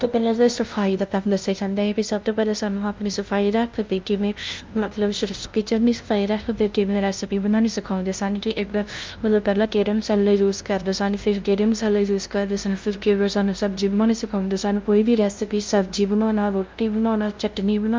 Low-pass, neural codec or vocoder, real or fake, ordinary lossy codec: 7.2 kHz; codec, 16 kHz, 0.5 kbps, FunCodec, trained on LibriTTS, 25 frames a second; fake; Opus, 24 kbps